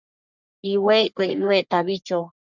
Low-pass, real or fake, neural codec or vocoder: 7.2 kHz; fake; codec, 44.1 kHz, 3.4 kbps, Pupu-Codec